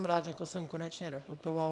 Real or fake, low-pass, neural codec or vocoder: fake; 10.8 kHz; codec, 24 kHz, 0.9 kbps, WavTokenizer, small release